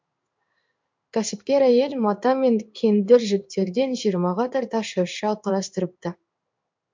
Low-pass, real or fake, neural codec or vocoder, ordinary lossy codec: 7.2 kHz; fake; codec, 16 kHz in and 24 kHz out, 1 kbps, XY-Tokenizer; MP3, 64 kbps